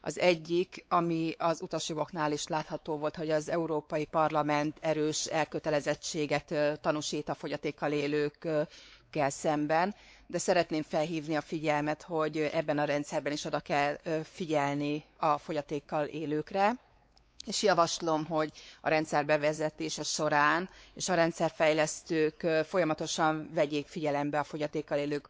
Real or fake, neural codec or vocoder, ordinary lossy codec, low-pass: fake; codec, 16 kHz, 4 kbps, X-Codec, WavLM features, trained on Multilingual LibriSpeech; none; none